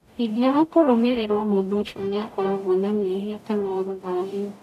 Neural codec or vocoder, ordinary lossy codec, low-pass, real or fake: codec, 44.1 kHz, 0.9 kbps, DAC; none; 14.4 kHz; fake